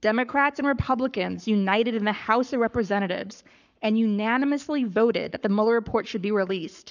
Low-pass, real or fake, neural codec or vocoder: 7.2 kHz; fake; codec, 44.1 kHz, 7.8 kbps, Pupu-Codec